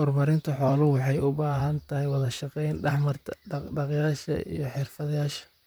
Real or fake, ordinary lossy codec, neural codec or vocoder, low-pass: fake; none; vocoder, 44.1 kHz, 128 mel bands, Pupu-Vocoder; none